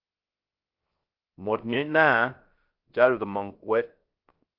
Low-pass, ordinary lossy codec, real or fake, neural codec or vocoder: 5.4 kHz; Opus, 32 kbps; fake; codec, 16 kHz, 0.3 kbps, FocalCodec